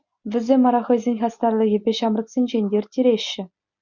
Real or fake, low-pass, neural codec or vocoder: real; 7.2 kHz; none